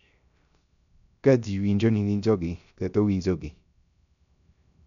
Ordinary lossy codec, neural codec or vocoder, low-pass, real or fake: none; codec, 16 kHz, 0.3 kbps, FocalCodec; 7.2 kHz; fake